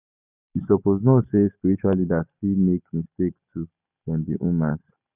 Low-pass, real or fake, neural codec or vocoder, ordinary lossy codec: 3.6 kHz; real; none; none